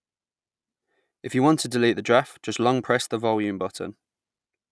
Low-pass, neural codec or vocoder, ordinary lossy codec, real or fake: none; none; none; real